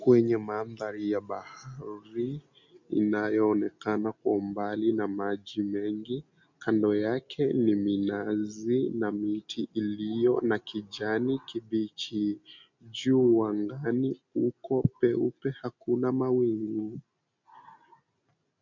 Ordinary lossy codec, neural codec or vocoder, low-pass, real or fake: MP3, 64 kbps; none; 7.2 kHz; real